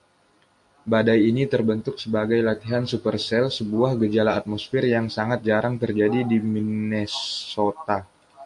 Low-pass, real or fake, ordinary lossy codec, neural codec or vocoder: 10.8 kHz; real; AAC, 64 kbps; none